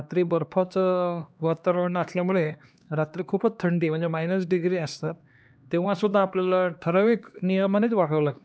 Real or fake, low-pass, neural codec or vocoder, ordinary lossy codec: fake; none; codec, 16 kHz, 2 kbps, X-Codec, HuBERT features, trained on LibriSpeech; none